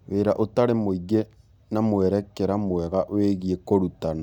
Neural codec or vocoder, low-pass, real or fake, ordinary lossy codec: none; 19.8 kHz; real; none